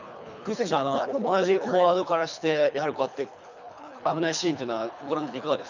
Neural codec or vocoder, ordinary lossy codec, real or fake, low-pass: codec, 24 kHz, 3 kbps, HILCodec; none; fake; 7.2 kHz